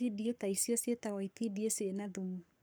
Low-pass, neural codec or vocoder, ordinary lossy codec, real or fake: none; codec, 44.1 kHz, 7.8 kbps, Pupu-Codec; none; fake